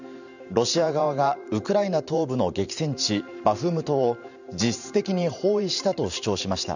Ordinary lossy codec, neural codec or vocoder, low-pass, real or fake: none; vocoder, 44.1 kHz, 128 mel bands every 512 samples, BigVGAN v2; 7.2 kHz; fake